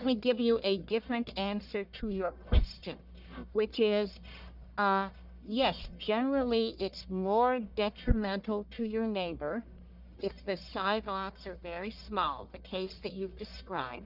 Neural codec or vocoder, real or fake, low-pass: codec, 44.1 kHz, 1.7 kbps, Pupu-Codec; fake; 5.4 kHz